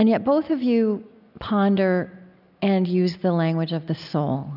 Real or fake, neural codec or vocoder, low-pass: real; none; 5.4 kHz